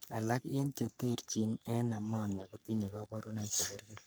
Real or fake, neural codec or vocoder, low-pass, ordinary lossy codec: fake; codec, 44.1 kHz, 3.4 kbps, Pupu-Codec; none; none